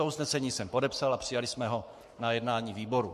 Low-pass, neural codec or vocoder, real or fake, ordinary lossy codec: 14.4 kHz; codec, 44.1 kHz, 7.8 kbps, Pupu-Codec; fake; MP3, 64 kbps